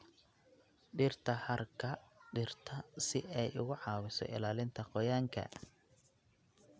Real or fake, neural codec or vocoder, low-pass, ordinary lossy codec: real; none; none; none